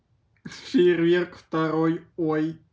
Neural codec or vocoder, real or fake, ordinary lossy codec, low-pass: none; real; none; none